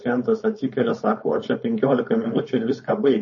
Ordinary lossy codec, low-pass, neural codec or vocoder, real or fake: MP3, 32 kbps; 7.2 kHz; codec, 16 kHz, 4.8 kbps, FACodec; fake